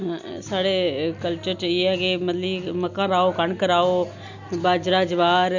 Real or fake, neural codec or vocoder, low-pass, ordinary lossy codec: real; none; 7.2 kHz; Opus, 64 kbps